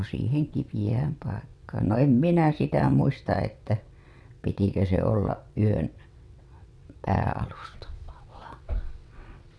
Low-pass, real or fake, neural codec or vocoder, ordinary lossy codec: none; fake; vocoder, 22.05 kHz, 80 mel bands, WaveNeXt; none